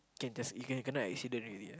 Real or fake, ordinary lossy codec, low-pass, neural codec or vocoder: real; none; none; none